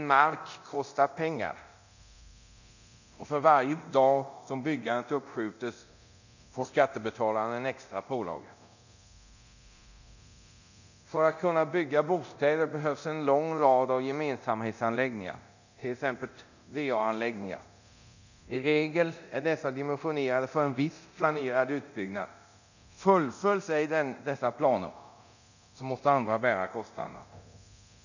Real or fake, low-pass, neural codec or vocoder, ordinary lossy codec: fake; 7.2 kHz; codec, 24 kHz, 0.9 kbps, DualCodec; none